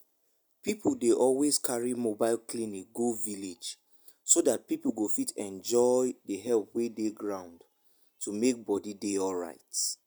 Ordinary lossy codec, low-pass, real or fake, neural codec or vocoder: none; none; real; none